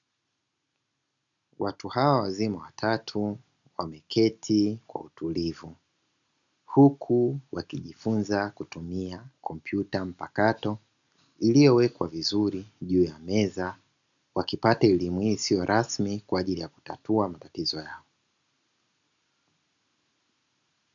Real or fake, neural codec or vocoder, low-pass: real; none; 7.2 kHz